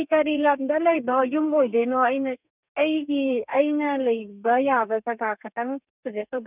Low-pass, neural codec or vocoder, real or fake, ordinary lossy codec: 3.6 kHz; codec, 32 kHz, 1.9 kbps, SNAC; fake; none